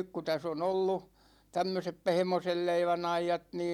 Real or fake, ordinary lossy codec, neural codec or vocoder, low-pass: real; none; none; 19.8 kHz